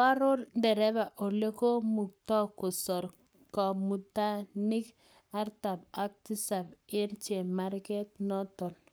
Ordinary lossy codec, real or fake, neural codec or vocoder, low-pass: none; fake; codec, 44.1 kHz, 7.8 kbps, Pupu-Codec; none